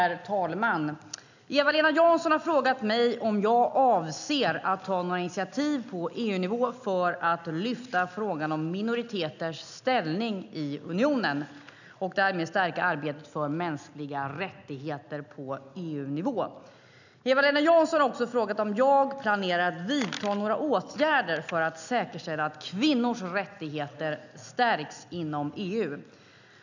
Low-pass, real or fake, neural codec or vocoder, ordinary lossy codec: 7.2 kHz; real; none; none